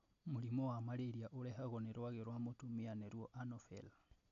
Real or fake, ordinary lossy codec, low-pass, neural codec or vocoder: real; AAC, 48 kbps; 7.2 kHz; none